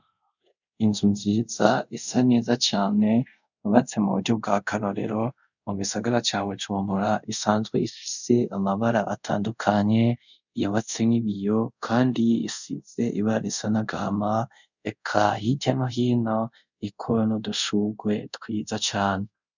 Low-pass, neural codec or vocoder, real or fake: 7.2 kHz; codec, 24 kHz, 0.5 kbps, DualCodec; fake